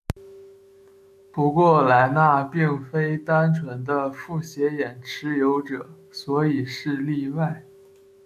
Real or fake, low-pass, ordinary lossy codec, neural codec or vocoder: fake; 14.4 kHz; AAC, 96 kbps; autoencoder, 48 kHz, 128 numbers a frame, DAC-VAE, trained on Japanese speech